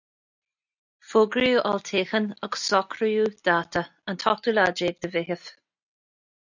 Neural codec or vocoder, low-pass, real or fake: none; 7.2 kHz; real